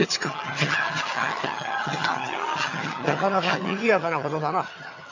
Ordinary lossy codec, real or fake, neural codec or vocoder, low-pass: AAC, 48 kbps; fake; vocoder, 22.05 kHz, 80 mel bands, HiFi-GAN; 7.2 kHz